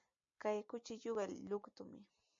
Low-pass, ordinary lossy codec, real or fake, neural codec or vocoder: 7.2 kHz; MP3, 48 kbps; real; none